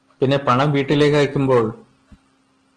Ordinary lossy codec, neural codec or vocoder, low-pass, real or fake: Opus, 16 kbps; none; 9.9 kHz; real